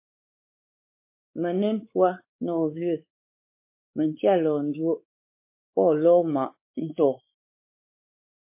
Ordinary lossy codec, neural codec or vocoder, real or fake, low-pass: MP3, 24 kbps; codec, 16 kHz, 4 kbps, X-Codec, WavLM features, trained on Multilingual LibriSpeech; fake; 3.6 kHz